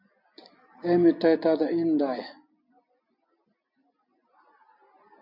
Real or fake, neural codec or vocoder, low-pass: real; none; 5.4 kHz